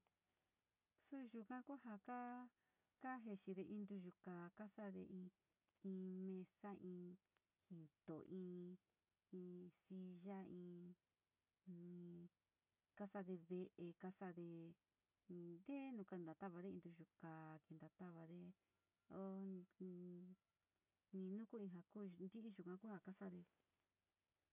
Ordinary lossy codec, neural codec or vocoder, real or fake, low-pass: none; none; real; 3.6 kHz